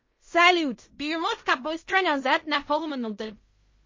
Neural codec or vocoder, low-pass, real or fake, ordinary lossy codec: codec, 16 kHz in and 24 kHz out, 0.4 kbps, LongCat-Audio-Codec, fine tuned four codebook decoder; 7.2 kHz; fake; MP3, 32 kbps